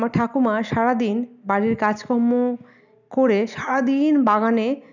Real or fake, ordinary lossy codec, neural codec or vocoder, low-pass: real; none; none; 7.2 kHz